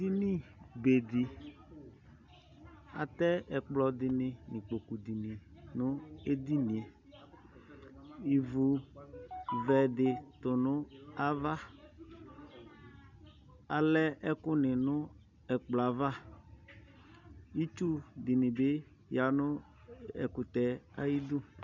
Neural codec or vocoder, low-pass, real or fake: none; 7.2 kHz; real